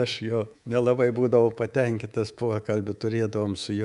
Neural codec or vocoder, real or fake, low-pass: codec, 24 kHz, 3.1 kbps, DualCodec; fake; 10.8 kHz